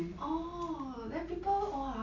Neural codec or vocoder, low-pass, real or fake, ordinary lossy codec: none; 7.2 kHz; real; none